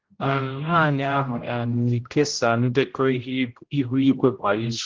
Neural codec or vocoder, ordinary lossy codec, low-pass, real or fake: codec, 16 kHz, 0.5 kbps, X-Codec, HuBERT features, trained on general audio; Opus, 16 kbps; 7.2 kHz; fake